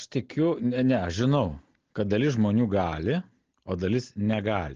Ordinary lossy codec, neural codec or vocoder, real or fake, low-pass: Opus, 16 kbps; none; real; 7.2 kHz